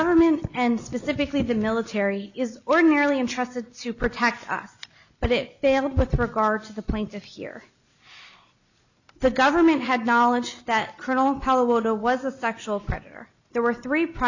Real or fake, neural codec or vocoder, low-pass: real; none; 7.2 kHz